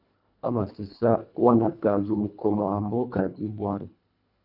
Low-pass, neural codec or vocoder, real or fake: 5.4 kHz; codec, 24 kHz, 1.5 kbps, HILCodec; fake